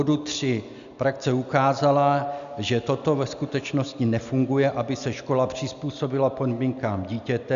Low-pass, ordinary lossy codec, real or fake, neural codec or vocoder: 7.2 kHz; MP3, 96 kbps; real; none